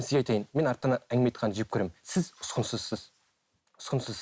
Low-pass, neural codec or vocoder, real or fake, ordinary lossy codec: none; none; real; none